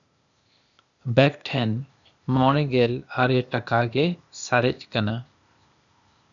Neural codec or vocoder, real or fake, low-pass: codec, 16 kHz, 0.8 kbps, ZipCodec; fake; 7.2 kHz